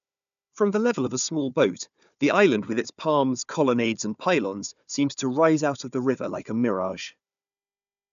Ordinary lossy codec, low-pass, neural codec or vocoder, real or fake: none; 7.2 kHz; codec, 16 kHz, 4 kbps, FunCodec, trained on Chinese and English, 50 frames a second; fake